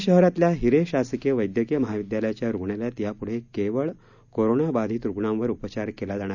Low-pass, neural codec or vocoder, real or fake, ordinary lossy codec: 7.2 kHz; none; real; none